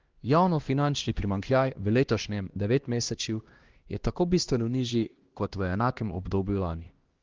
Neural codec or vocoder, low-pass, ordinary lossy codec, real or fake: codec, 16 kHz, 1 kbps, X-Codec, HuBERT features, trained on LibriSpeech; 7.2 kHz; Opus, 16 kbps; fake